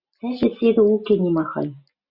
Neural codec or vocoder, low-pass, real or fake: none; 5.4 kHz; real